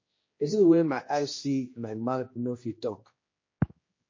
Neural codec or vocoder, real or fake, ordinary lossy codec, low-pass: codec, 16 kHz, 1 kbps, X-Codec, HuBERT features, trained on general audio; fake; MP3, 32 kbps; 7.2 kHz